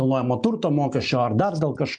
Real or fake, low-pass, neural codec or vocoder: real; 9.9 kHz; none